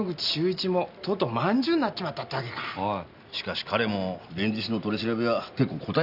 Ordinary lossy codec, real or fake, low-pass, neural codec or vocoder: none; real; 5.4 kHz; none